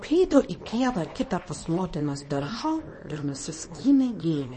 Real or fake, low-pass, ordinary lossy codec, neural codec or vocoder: fake; 10.8 kHz; MP3, 32 kbps; codec, 24 kHz, 0.9 kbps, WavTokenizer, small release